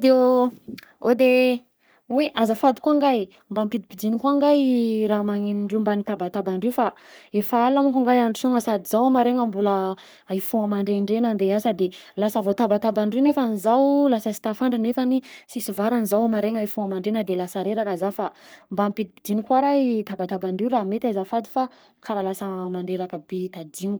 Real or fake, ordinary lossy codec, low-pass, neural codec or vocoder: fake; none; none; codec, 44.1 kHz, 3.4 kbps, Pupu-Codec